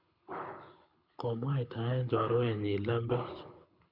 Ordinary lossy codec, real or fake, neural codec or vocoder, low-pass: none; fake; codec, 24 kHz, 6 kbps, HILCodec; 5.4 kHz